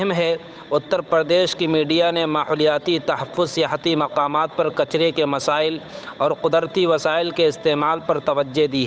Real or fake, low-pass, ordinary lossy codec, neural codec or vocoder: fake; none; none; codec, 16 kHz, 8 kbps, FunCodec, trained on Chinese and English, 25 frames a second